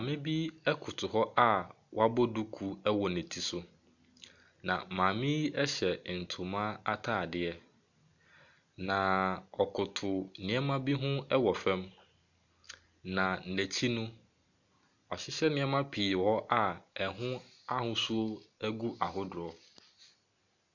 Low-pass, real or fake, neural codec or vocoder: 7.2 kHz; real; none